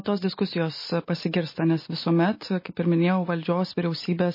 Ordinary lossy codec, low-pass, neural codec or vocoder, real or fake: MP3, 24 kbps; 5.4 kHz; none; real